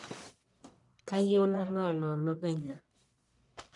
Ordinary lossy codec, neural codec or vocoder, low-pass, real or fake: none; codec, 44.1 kHz, 1.7 kbps, Pupu-Codec; 10.8 kHz; fake